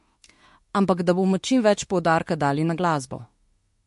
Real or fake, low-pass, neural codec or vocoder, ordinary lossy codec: fake; 14.4 kHz; autoencoder, 48 kHz, 32 numbers a frame, DAC-VAE, trained on Japanese speech; MP3, 48 kbps